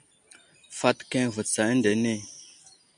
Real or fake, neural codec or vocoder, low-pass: real; none; 9.9 kHz